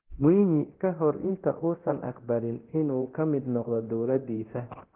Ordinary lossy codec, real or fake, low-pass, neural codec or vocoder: Opus, 16 kbps; fake; 3.6 kHz; codec, 24 kHz, 0.9 kbps, DualCodec